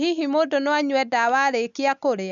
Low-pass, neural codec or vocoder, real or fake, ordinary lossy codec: 7.2 kHz; none; real; none